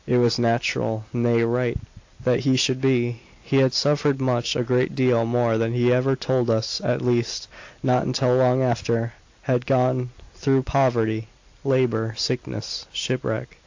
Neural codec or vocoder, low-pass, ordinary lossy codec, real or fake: none; 7.2 kHz; AAC, 48 kbps; real